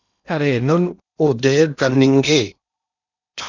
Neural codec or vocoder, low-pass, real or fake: codec, 16 kHz in and 24 kHz out, 0.8 kbps, FocalCodec, streaming, 65536 codes; 7.2 kHz; fake